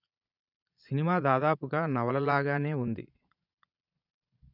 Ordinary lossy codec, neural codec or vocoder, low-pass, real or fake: none; vocoder, 22.05 kHz, 80 mel bands, Vocos; 5.4 kHz; fake